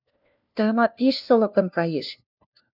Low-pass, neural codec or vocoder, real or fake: 5.4 kHz; codec, 16 kHz, 1 kbps, FunCodec, trained on LibriTTS, 50 frames a second; fake